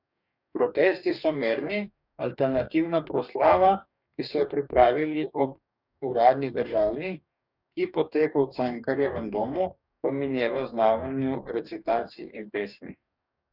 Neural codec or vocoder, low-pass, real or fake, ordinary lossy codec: codec, 44.1 kHz, 2.6 kbps, DAC; 5.4 kHz; fake; none